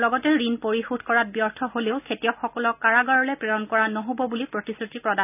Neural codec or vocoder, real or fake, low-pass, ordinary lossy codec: none; real; 3.6 kHz; none